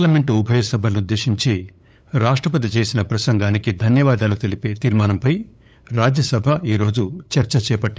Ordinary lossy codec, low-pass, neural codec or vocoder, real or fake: none; none; codec, 16 kHz, 8 kbps, FunCodec, trained on LibriTTS, 25 frames a second; fake